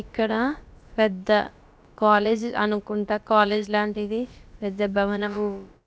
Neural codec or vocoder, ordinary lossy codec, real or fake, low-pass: codec, 16 kHz, about 1 kbps, DyCAST, with the encoder's durations; none; fake; none